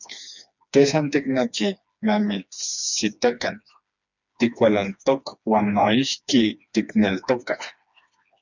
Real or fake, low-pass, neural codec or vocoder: fake; 7.2 kHz; codec, 16 kHz, 2 kbps, FreqCodec, smaller model